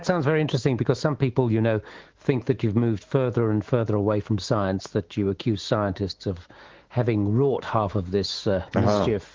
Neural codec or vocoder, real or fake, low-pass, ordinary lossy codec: autoencoder, 48 kHz, 128 numbers a frame, DAC-VAE, trained on Japanese speech; fake; 7.2 kHz; Opus, 16 kbps